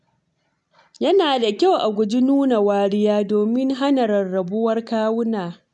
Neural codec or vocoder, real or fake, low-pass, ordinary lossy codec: none; real; 10.8 kHz; none